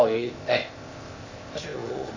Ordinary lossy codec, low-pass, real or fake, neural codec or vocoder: AAC, 48 kbps; 7.2 kHz; fake; codec, 16 kHz, 0.8 kbps, ZipCodec